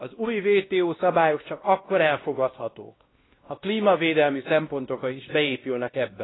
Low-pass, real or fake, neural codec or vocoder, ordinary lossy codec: 7.2 kHz; fake; codec, 16 kHz, 1 kbps, X-Codec, WavLM features, trained on Multilingual LibriSpeech; AAC, 16 kbps